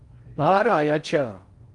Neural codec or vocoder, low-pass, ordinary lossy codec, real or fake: codec, 16 kHz in and 24 kHz out, 0.6 kbps, FocalCodec, streaming, 4096 codes; 10.8 kHz; Opus, 32 kbps; fake